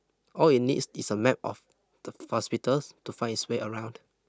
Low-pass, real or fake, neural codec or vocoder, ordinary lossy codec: none; real; none; none